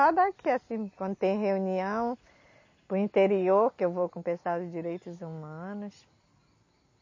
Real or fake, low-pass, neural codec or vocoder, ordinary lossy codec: real; 7.2 kHz; none; MP3, 32 kbps